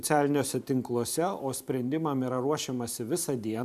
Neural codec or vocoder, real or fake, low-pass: vocoder, 44.1 kHz, 128 mel bands every 512 samples, BigVGAN v2; fake; 14.4 kHz